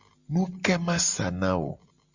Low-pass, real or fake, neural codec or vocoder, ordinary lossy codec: 7.2 kHz; real; none; Opus, 64 kbps